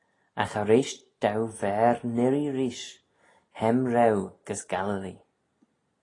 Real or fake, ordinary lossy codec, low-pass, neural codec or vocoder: real; AAC, 32 kbps; 10.8 kHz; none